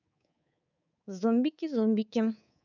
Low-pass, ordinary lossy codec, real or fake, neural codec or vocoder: 7.2 kHz; none; fake; codec, 24 kHz, 3.1 kbps, DualCodec